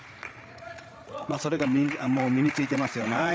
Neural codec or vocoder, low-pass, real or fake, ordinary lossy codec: codec, 16 kHz, 8 kbps, FreqCodec, larger model; none; fake; none